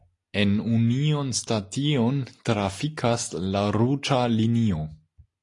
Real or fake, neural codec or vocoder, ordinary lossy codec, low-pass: real; none; AAC, 48 kbps; 10.8 kHz